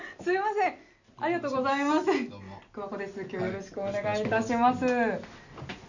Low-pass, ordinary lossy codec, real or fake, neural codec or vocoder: 7.2 kHz; none; real; none